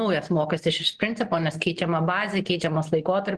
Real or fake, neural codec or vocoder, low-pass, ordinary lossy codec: real; none; 10.8 kHz; Opus, 16 kbps